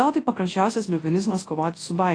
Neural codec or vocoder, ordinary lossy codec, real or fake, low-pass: codec, 24 kHz, 0.9 kbps, WavTokenizer, large speech release; AAC, 32 kbps; fake; 9.9 kHz